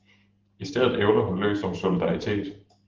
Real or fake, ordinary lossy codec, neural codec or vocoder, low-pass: real; Opus, 16 kbps; none; 7.2 kHz